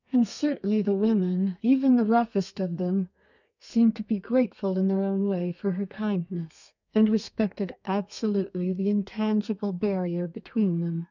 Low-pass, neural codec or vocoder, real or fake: 7.2 kHz; codec, 32 kHz, 1.9 kbps, SNAC; fake